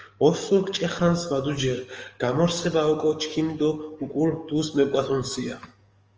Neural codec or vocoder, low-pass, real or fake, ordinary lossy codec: codec, 44.1 kHz, 7.8 kbps, DAC; 7.2 kHz; fake; Opus, 24 kbps